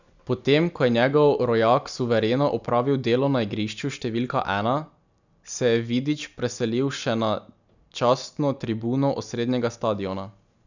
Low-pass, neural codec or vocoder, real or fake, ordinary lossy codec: 7.2 kHz; none; real; none